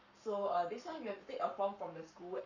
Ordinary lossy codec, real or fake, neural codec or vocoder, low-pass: none; fake; codec, 44.1 kHz, 7.8 kbps, Pupu-Codec; 7.2 kHz